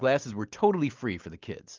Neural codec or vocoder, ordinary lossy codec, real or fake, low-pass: none; Opus, 24 kbps; real; 7.2 kHz